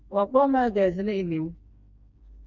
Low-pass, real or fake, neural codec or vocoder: 7.2 kHz; fake; codec, 16 kHz, 2 kbps, FreqCodec, smaller model